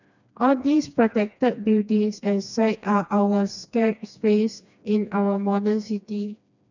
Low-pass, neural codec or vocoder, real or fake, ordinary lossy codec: 7.2 kHz; codec, 16 kHz, 2 kbps, FreqCodec, smaller model; fake; none